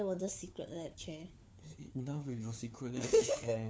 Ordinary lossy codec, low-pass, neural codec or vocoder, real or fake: none; none; codec, 16 kHz, 4 kbps, FunCodec, trained on LibriTTS, 50 frames a second; fake